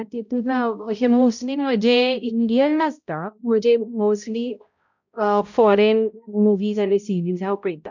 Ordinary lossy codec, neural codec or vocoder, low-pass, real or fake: none; codec, 16 kHz, 0.5 kbps, X-Codec, HuBERT features, trained on balanced general audio; 7.2 kHz; fake